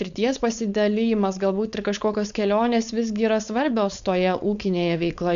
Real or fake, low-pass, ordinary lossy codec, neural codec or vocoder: fake; 7.2 kHz; MP3, 96 kbps; codec, 16 kHz, 4.8 kbps, FACodec